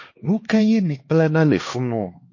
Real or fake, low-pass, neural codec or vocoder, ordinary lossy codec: fake; 7.2 kHz; codec, 16 kHz, 2 kbps, X-Codec, HuBERT features, trained on LibriSpeech; MP3, 32 kbps